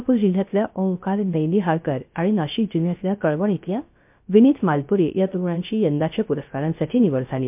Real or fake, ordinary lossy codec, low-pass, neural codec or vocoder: fake; MP3, 32 kbps; 3.6 kHz; codec, 16 kHz, 0.3 kbps, FocalCodec